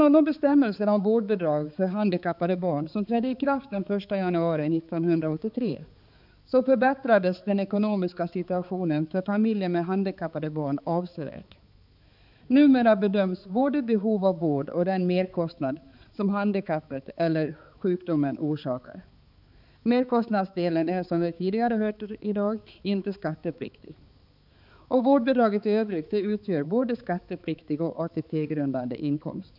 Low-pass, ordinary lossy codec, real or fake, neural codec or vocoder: 5.4 kHz; none; fake; codec, 16 kHz, 4 kbps, X-Codec, HuBERT features, trained on balanced general audio